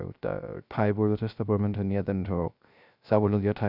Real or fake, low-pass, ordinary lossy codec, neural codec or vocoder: fake; 5.4 kHz; none; codec, 16 kHz, 0.3 kbps, FocalCodec